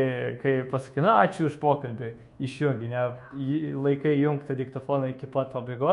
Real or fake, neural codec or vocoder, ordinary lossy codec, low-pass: fake; codec, 24 kHz, 1.2 kbps, DualCodec; MP3, 64 kbps; 10.8 kHz